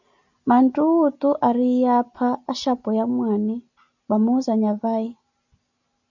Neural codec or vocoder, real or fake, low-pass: none; real; 7.2 kHz